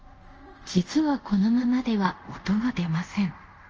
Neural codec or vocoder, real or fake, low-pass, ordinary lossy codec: codec, 24 kHz, 0.5 kbps, DualCodec; fake; 7.2 kHz; Opus, 24 kbps